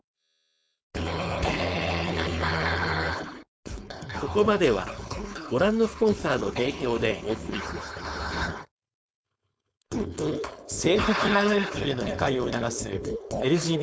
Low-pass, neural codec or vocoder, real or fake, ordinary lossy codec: none; codec, 16 kHz, 4.8 kbps, FACodec; fake; none